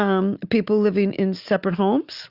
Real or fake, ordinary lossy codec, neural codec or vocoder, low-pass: real; Opus, 64 kbps; none; 5.4 kHz